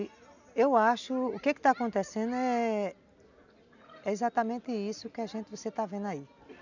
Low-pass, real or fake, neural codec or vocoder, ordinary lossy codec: 7.2 kHz; real; none; none